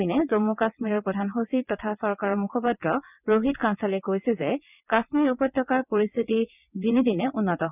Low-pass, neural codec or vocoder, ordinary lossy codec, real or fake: 3.6 kHz; vocoder, 22.05 kHz, 80 mel bands, WaveNeXt; none; fake